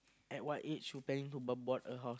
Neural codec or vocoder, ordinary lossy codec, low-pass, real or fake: none; none; none; real